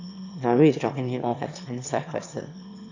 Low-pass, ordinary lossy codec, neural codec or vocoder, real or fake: 7.2 kHz; AAC, 48 kbps; autoencoder, 22.05 kHz, a latent of 192 numbers a frame, VITS, trained on one speaker; fake